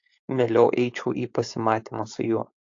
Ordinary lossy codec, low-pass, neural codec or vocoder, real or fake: AAC, 48 kbps; 7.2 kHz; codec, 16 kHz, 4.8 kbps, FACodec; fake